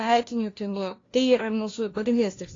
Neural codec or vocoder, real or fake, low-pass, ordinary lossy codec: codec, 16 kHz, 1 kbps, FunCodec, trained on LibriTTS, 50 frames a second; fake; 7.2 kHz; AAC, 32 kbps